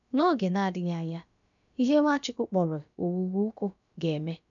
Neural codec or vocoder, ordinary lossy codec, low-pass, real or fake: codec, 16 kHz, about 1 kbps, DyCAST, with the encoder's durations; none; 7.2 kHz; fake